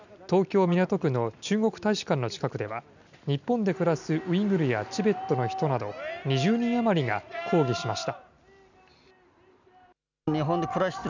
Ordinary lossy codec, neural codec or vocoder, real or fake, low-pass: none; none; real; 7.2 kHz